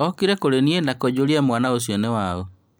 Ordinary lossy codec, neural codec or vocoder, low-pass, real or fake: none; none; none; real